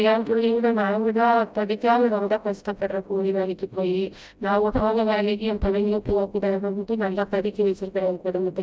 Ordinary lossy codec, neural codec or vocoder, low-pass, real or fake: none; codec, 16 kHz, 0.5 kbps, FreqCodec, smaller model; none; fake